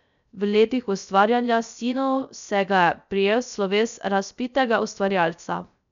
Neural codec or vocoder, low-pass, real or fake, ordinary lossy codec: codec, 16 kHz, 0.3 kbps, FocalCodec; 7.2 kHz; fake; none